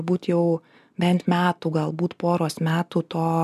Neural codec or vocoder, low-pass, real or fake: none; 14.4 kHz; real